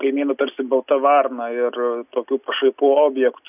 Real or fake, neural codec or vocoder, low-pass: real; none; 3.6 kHz